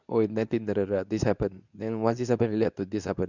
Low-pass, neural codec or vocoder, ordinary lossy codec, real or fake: 7.2 kHz; codec, 24 kHz, 0.9 kbps, WavTokenizer, medium speech release version 2; none; fake